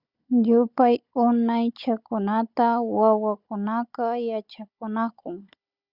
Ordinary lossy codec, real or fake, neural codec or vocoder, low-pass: Opus, 64 kbps; fake; codec, 16 kHz, 16 kbps, FunCodec, trained on Chinese and English, 50 frames a second; 5.4 kHz